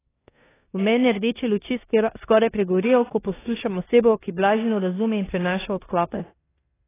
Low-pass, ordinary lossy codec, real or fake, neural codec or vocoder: 3.6 kHz; AAC, 16 kbps; fake; codec, 16 kHz in and 24 kHz out, 0.9 kbps, LongCat-Audio-Codec, four codebook decoder